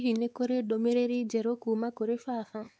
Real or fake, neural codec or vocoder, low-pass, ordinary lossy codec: fake; codec, 16 kHz, 4 kbps, X-Codec, WavLM features, trained on Multilingual LibriSpeech; none; none